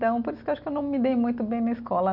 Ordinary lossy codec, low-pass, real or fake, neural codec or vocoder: none; 5.4 kHz; real; none